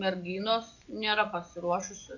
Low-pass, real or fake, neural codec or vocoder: 7.2 kHz; fake; vocoder, 24 kHz, 100 mel bands, Vocos